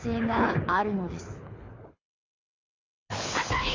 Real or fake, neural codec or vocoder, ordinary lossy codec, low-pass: fake; codec, 24 kHz, 6 kbps, HILCodec; AAC, 32 kbps; 7.2 kHz